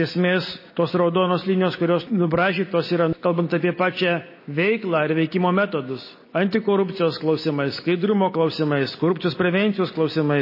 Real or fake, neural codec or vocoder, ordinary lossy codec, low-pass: real; none; MP3, 24 kbps; 5.4 kHz